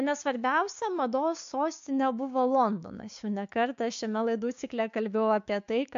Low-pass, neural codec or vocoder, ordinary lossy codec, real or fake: 7.2 kHz; codec, 16 kHz, 6 kbps, DAC; MP3, 64 kbps; fake